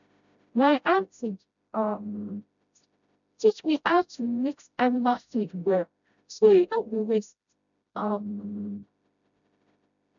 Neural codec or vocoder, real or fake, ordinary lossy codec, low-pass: codec, 16 kHz, 0.5 kbps, FreqCodec, smaller model; fake; none; 7.2 kHz